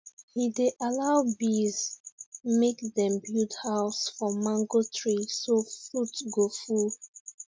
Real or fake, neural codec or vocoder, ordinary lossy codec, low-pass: real; none; none; none